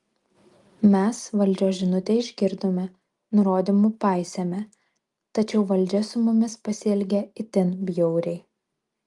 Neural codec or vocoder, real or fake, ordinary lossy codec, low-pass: none; real; Opus, 32 kbps; 10.8 kHz